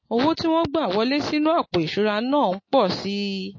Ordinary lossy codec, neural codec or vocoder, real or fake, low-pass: MP3, 32 kbps; none; real; 7.2 kHz